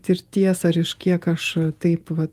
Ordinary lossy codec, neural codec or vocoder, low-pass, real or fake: Opus, 32 kbps; vocoder, 48 kHz, 128 mel bands, Vocos; 14.4 kHz; fake